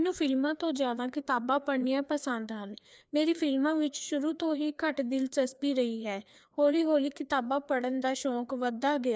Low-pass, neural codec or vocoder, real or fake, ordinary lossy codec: none; codec, 16 kHz, 2 kbps, FreqCodec, larger model; fake; none